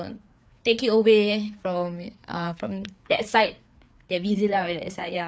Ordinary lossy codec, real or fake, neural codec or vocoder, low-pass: none; fake; codec, 16 kHz, 4 kbps, FreqCodec, larger model; none